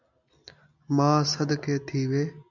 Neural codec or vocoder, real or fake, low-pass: none; real; 7.2 kHz